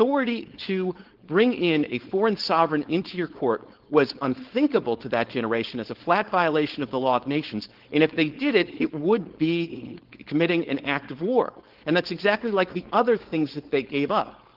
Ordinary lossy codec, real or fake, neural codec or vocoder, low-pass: Opus, 16 kbps; fake; codec, 16 kHz, 4.8 kbps, FACodec; 5.4 kHz